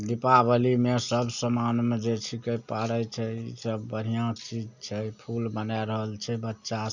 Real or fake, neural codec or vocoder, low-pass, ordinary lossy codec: real; none; 7.2 kHz; none